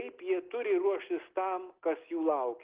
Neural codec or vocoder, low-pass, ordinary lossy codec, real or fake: none; 3.6 kHz; Opus, 16 kbps; real